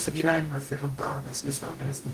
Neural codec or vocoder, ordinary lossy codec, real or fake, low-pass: codec, 44.1 kHz, 0.9 kbps, DAC; Opus, 24 kbps; fake; 14.4 kHz